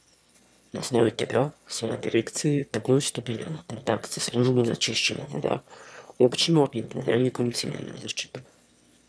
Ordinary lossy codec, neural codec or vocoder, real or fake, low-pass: none; autoencoder, 22.05 kHz, a latent of 192 numbers a frame, VITS, trained on one speaker; fake; none